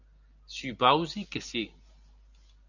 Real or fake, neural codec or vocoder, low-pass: real; none; 7.2 kHz